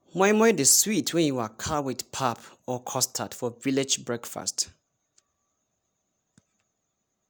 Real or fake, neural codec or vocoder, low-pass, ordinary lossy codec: real; none; none; none